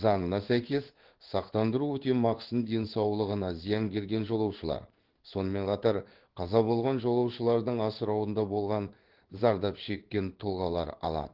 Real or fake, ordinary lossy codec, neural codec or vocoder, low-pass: fake; Opus, 16 kbps; codec, 16 kHz in and 24 kHz out, 1 kbps, XY-Tokenizer; 5.4 kHz